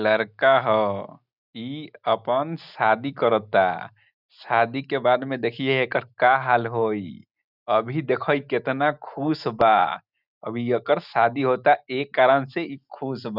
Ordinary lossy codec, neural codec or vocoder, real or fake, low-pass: none; none; real; 5.4 kHz